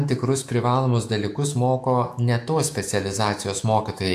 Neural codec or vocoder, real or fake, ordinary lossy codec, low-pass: autoencoder, 48 kHz, 128 numbers a frame, DAC-VAE, trained on Japanese speech; fake; AAC, 64 kbps; 14.4 kHz